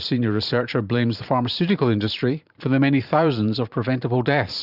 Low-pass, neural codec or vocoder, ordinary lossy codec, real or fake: 5.4 kHz; vocoder, 44.1 kHz, 128 mel bands every 512 samples, BigVGAN v2; Opus, 64 kbps; fake